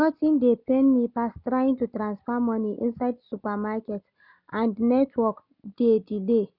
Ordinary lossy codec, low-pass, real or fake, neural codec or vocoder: none; 5.4 kHz; real; none